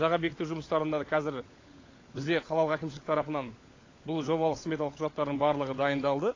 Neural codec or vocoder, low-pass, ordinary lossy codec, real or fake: codec, 16 kHz, 16 kbps, FunCodec, trained on LibriTTS, 50 frames a second; 7.2 kHz; AAC, 32 kbps; fake